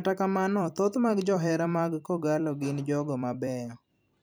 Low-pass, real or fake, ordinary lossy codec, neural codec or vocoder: none; fake; none; vocoder, 44.1 kHz, 128 mel bands every 256 samples, BigVGAN v2